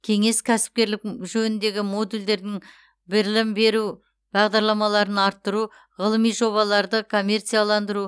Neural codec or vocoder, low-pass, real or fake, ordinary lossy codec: none; none; real; none